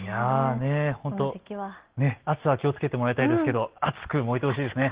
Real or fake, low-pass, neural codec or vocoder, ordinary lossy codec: real; 3.6 kHz; none; Opus, 16 kbps